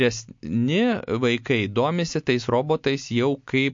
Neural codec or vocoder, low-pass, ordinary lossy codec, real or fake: none; 7.2 kHz; MP3, 48 kbps; real